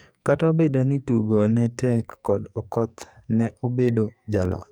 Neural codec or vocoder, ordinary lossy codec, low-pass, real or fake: codec, 44.1 kHz, 2.6 kbps, SNAC; none; none; fake